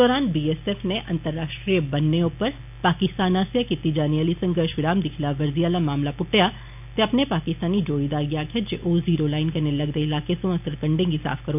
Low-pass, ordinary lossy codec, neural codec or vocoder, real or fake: 3.6 kHz; none; none; real